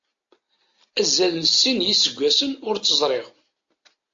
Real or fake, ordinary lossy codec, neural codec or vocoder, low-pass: real; AAC, 48 kbps; none; 7.2 kHz